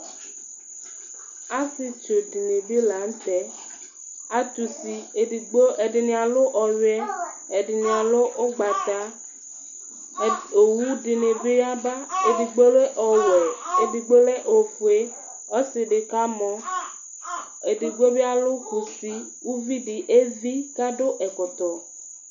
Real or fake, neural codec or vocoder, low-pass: real; none; 7.2 kHz